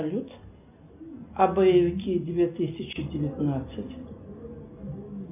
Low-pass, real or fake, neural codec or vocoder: 3.6 kHz; real; none